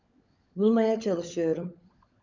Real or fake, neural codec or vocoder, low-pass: fake; codec, 16 kHz, 16 kbps, FunCodec, trained on LibriTTS, 50 frames a second; 7.2 kHz